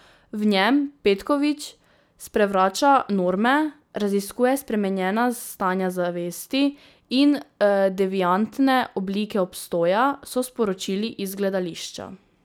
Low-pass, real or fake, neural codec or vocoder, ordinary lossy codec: none; real; none; none